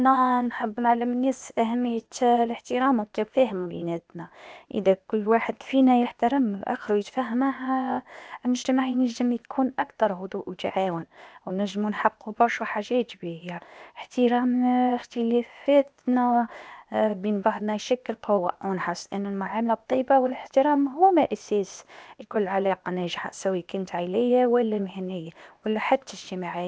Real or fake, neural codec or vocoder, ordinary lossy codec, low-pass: fake; codec, 16 kHz, 0.8 kbps, ZipCodec; none; none